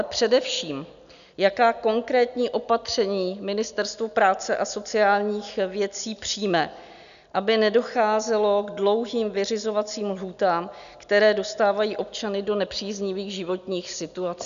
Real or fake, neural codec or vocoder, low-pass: real; none; 7.2 kHz